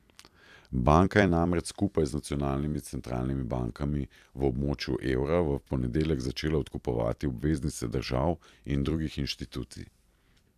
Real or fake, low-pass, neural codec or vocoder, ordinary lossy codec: fake; 14.4 kHz; vocoder, 44.1 kHz, 128 mel bands every 256 samples, BigVGAN v2; AAC, 96 kbps